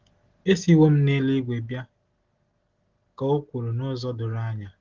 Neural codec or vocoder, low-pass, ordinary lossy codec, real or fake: none; 7.2 kHz; Opus, 16 kbps; real